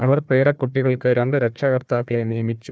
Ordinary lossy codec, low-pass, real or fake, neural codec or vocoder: none; none; fake; codec, 16 kHz, 1 kbps, FunCodec, trained on Chinese and English, 50 frames a second